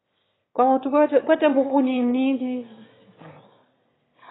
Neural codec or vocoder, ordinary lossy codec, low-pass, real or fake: autoencoder, 22.05 kHz, a latent of 192 numbers a frame, VITS, trained on one speaker; AAC, 16 kbps; 7.2 kHz; fake